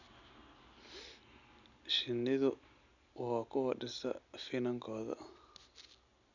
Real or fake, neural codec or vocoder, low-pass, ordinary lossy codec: real; none; 7.2 kHz; none